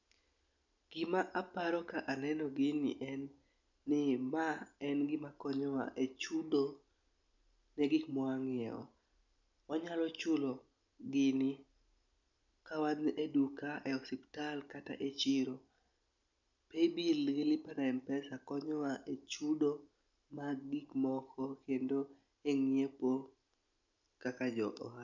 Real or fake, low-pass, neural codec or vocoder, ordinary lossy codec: real; 7.2 kHz; none; none